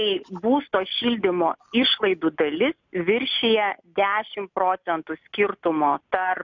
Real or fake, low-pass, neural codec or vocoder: real; 7.2 kHz; none